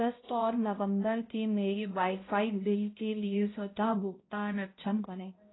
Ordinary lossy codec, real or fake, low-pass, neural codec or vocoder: AAC, 16 kbps; fake; 7.2 kHz; codec, 16 kHz, 0.5 kbps, X-Codec, HuBERT features, trained on balanced general audio